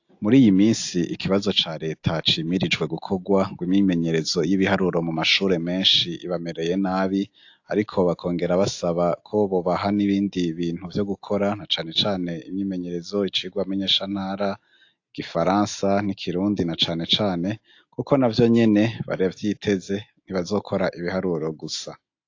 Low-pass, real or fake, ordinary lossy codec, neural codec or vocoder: 7.2 kHz; real; AAC, 48 kbps; none